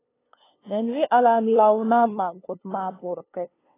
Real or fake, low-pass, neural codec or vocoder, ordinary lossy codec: fake; 3.6 kHz; codec, 16 kHz, 2 kbps, FunCodec, trained on LibriTTS, 25 frames a second; AAC, 16 kbps